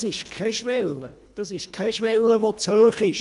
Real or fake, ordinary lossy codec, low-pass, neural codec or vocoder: fake; none; 10.8 kHz; codec, 24 kHz, 1.5 kbps, HILCodec